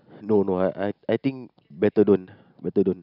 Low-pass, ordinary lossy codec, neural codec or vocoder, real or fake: 5.4 kHz; none; none; real